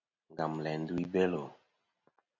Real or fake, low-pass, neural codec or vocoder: real; 7.2 kHz; none